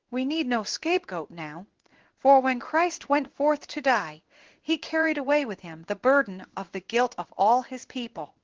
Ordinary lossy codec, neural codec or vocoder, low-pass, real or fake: Opus, 16 kbps; codec, 16 kHz in and 24 kHz out, 1 kbps, XY-Tokenizer; 7.2 kHz; fake